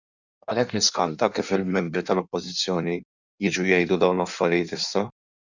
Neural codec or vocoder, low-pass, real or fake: codec, 16 kHz in and 24 kHz out, 1.1 kbps, FireRedTTS-2 codec; 7.2 kHz; fake